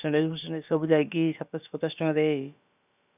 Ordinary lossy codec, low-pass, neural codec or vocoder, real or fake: none; 3.6 kHz; codec, 16 kHz, about 1 kbps, DyCAST, with the encoder's durations; fake